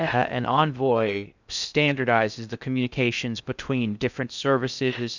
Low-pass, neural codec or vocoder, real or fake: 7.2 kHz; codec, 16 kHz in and 24 kHz out, 0.6 kbps, FocalCodec, streaming, 2048 codes; fake